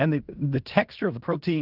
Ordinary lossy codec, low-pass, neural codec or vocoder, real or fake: Opus, 32 kbps; 5.4 kHz; codec, 16 kHz in and 24 kHz out, 0.4 kbps, LongCat-Audio-Codec, fine tuned four codebook decoder; fake